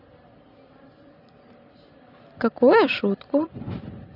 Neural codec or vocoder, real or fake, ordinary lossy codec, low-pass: vocoder, 44.1 kHz, 128 mel bands every 512 samples, BigVGAN v2; fake; none; 5.4 kHz